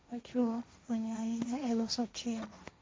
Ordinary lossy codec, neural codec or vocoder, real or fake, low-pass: none; codec, 16 kHz, 1.1 kbps, Voila-Tokenizer; fake; none